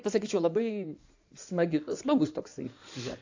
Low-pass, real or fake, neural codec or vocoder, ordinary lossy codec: 7.2 kHz; fake; codec, 16 kHz in and 24 kHz out, 2.2 kbps, FireRedTTS-2 codec; AAC, 48 kbps